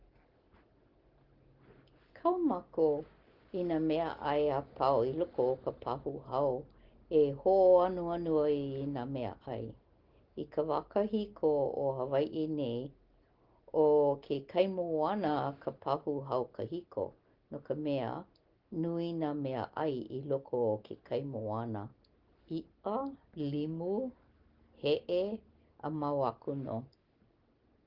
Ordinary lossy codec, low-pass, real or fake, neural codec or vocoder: Opus, 16 kbps; 5.4 kHz; real; none